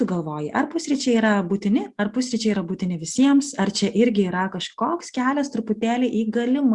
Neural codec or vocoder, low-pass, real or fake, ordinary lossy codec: none; 10.8 kHz; real; Opus, 64 kbps